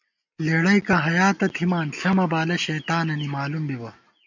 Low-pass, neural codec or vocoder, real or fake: 7.2 kHz; none; real